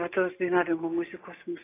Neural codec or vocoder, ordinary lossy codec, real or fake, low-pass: none; AAC, 16 kbps; real; 3.6 kHz